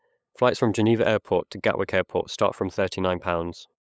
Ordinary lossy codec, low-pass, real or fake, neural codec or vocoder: none; none; fake; codec, 16 kHz, 8 kbps, FunCodec, trained on LibriTTS, 25 frames a second